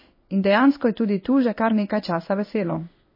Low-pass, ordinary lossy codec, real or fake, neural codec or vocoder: 5.4 kHz; MP3, 24 kbps; fake; codec, 16 kHz in and 24 kHz out, 1 kbps, XY-Tokenizer